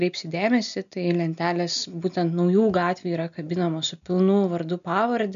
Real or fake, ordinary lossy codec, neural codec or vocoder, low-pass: real; MP3, 96 kbps; none; 7.2 kHz